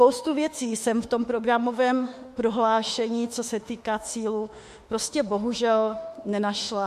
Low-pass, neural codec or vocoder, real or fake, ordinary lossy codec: 14.4 kHz; autoencoder, 48 kHz, 32 numbers a frame, DAC-VAE, trained on Japanese speech; fake; MP3, 64 kbps